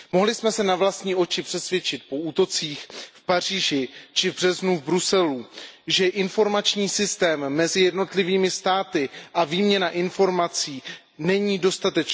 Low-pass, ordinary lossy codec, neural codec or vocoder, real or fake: none; none; none; real